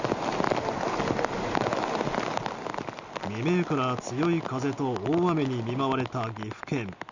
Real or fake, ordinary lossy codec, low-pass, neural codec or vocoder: real; Opus, 64 kbps; 7.2 kHz; none